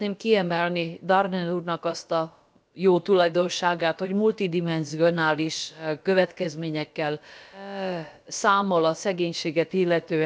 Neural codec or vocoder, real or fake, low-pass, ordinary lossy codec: codec, 16 kHz, about 1 kbps, DyCAST, with the encoder's durations; fake; none; none